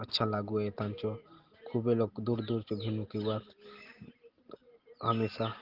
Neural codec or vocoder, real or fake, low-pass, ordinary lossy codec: none; real; 5.4 kHz; Opus, 16 kbps